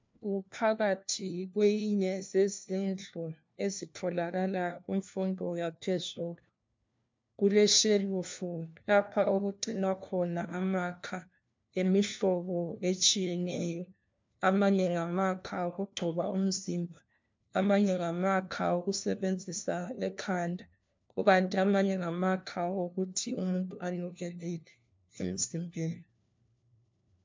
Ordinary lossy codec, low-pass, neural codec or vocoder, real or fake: MP3, 64 kbps; 7.2 kHz; codec, 16 kHz, 1 kbps, FunCodec, trained on LibriTTS, 50 frames a second; fake